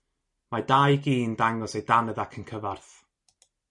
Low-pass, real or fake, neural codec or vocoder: 10.8 kHz; real; none